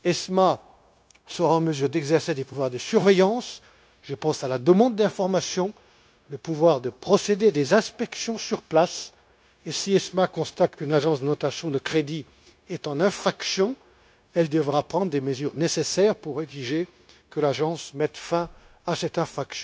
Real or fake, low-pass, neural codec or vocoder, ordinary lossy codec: fake; none; codec, 16 kHz, 0.9 kbps, LongCat-Audio-Codec; none